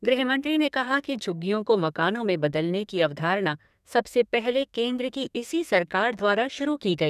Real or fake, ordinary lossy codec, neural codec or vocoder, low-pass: fake; none; codec, 32 kHz, 1.9 kbps, SNAC; 14.4 kHz